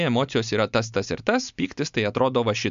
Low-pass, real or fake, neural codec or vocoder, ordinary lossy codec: 7.2 kHz; real; none; MP3, 64 kbps